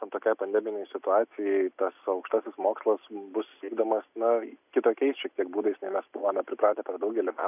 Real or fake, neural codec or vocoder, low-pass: real; none; 3.6 kHz